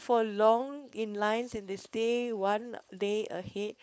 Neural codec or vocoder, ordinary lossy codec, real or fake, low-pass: codec, 16 kHz, 4.8 kbps, FACodec; none; fake; none